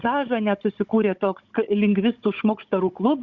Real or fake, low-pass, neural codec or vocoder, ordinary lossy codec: fake; 7.2 kHz; codec, 16 kHz, 16 kbps, FunCodec, trained on Chinese and English, 50 frames a second; Opus, 64 kbps